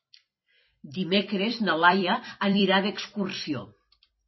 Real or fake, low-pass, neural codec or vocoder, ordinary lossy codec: fake; 7.2 kHz; vocoder, 44.1 kHz, 128 mel bands every 256 samples, BigVGAN v2; MP3, 24 kbps